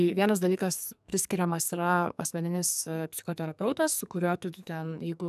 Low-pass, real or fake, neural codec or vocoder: 14.4 kHz; fake; codec, 44.1 kHz, 2.6 kbps, SNAC